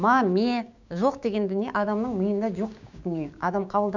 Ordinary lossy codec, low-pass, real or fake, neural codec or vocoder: none; 7.2 kHz; fake; codec, 16 kHz, 6 kbps, DAC